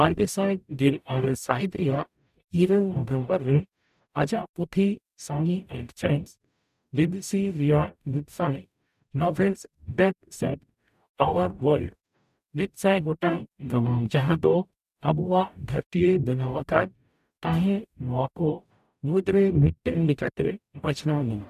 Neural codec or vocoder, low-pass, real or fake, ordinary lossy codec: codec, 44.1 kHz, 0.9 kbps, DAC; 14.4 kHz; fake; none